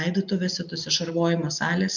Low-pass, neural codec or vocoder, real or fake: 7.2 kHz; none; real